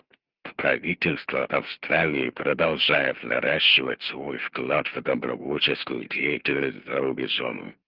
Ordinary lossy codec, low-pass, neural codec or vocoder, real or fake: Opus, 24 kbps; 5.4 kHz; none; real